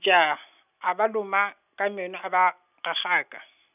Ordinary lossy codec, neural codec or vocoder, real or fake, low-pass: none; none; real; 3.6 kHz